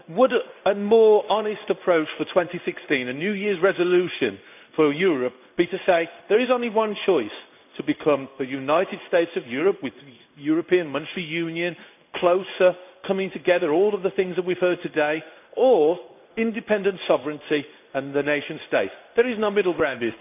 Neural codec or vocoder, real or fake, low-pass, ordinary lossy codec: codec, 16 kHz in and 24 kHz out, 1 kbps, XY-Tokenizer; fake; 3.6 kHz; none